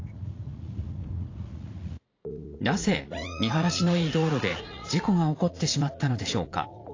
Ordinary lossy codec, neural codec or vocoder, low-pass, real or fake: AAC, 32 kbps; vocoder, 44.1 kHz, 80 mel bands, Vocos; 7.2 kHz; fake